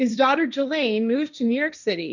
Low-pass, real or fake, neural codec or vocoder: 7.2 kHz; fake; codec, 16 kHz, 1.1 kbps, Voila-Tokenizer